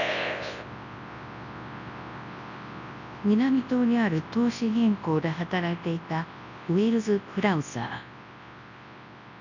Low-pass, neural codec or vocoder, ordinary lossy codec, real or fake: 7.2 kHz; codec, 24 kHz, 0.9 kbps, WavTokenizer, large speech release; none; fake